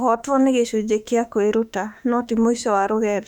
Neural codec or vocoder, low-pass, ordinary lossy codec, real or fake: autoencoder, 48 kHz, 32 numbers a frame, DAC-VAE, trained on Japanese speech; 19.8 kHz; none; fake